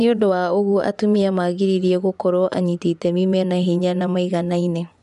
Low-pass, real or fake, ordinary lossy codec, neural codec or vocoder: 10.8 kHz; fake; none; vocoder, 24 kHz, 100 mel bands, Vocos